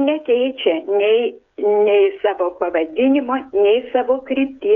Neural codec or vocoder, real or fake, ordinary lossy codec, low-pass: codec, 16 kHz, 8 kbps, FreqCodec, smaller model; fake; MP3, 96 kbps; 7.2 kHz